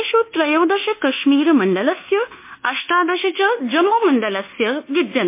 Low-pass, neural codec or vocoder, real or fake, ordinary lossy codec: 3.6 kHz; codec, 24 kHz, 1.2 kbps, DualCodec; fake; MP3, 24 kbps